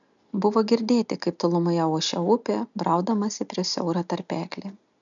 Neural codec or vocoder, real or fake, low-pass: none; real; 7.2 kHz